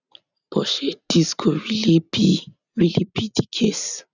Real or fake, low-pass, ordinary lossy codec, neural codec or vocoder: real; 7.2 kHz; none; none